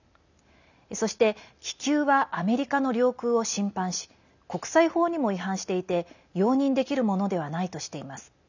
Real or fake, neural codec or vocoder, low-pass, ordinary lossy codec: real; none; 7.2 kHz; none